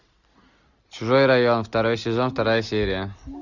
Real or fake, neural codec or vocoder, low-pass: real; none; 7.2 kHz